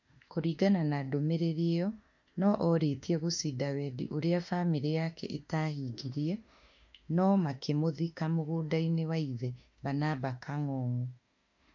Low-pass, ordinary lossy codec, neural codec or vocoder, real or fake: 7.2 kHz; MP3, 48 kbps; autoencoder, 48 kHz, 32 numbers a frame, DAC-VAE, trained on Japanese speech; fake